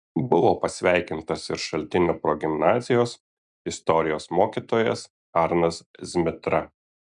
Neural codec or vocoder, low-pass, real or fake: none; 10.8 kHz; real